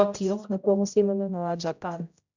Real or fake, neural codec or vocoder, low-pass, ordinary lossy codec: fake; codec, 16 kHz, 0.5 kbps, X-Codec, HuBERT features, trained on general audio; 7.2 kHz; none